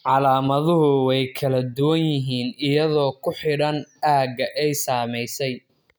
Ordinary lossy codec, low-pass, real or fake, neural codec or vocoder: none; none; real; none